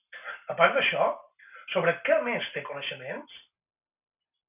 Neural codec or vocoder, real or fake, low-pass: none; real; 3.6 kHz